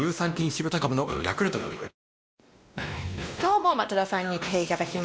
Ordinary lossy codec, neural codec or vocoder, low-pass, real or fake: none; codec, 16 kHz, 1 kbps, X-Codec, WavLM features, trained on Multilingual LibriSpeech; none; fake